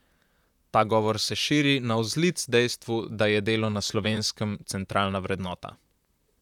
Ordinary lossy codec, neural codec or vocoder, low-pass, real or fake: none; vocoder, 44.1 kHz, 128 mel bands, Pupu-Vocoder; 19.8 kHz; fake